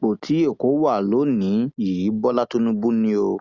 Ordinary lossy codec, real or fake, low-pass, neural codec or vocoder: Opus, 64 kbps; real; 7.2 kHz; none